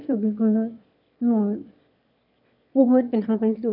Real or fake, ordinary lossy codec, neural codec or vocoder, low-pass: fake; none; autoencoder, 22.05 kHz, a latent of 192 numbers a frame, VITS, trained on one speaker; 5.4 kHz